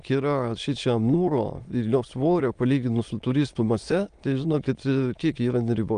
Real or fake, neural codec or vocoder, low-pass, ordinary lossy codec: fake; autoencoder, 22.05 kHz, a latent of 192 numbers a frame, VITS, trained on many speakers; 9.9 kHz; Opus, 32 kbps